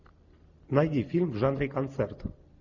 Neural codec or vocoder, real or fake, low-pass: none; real; 7.2 kHz